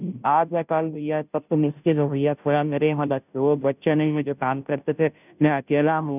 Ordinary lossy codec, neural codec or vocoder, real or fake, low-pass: none; codec, 16 kHz, 0.5 kbps, FunCodec, trained on Chinese and English, 25 frames a second; fake; 3.6 kHz